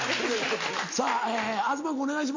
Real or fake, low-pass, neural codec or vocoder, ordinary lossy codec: real; 7.2 kHz; none; MP3, 64 kbps